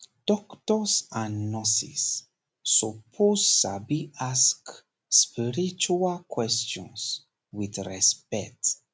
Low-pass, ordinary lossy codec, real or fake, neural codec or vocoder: none; none; real; none